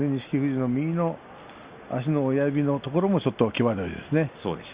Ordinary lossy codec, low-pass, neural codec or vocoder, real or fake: Opus, 64 kbps; 3.6 kHz; none; real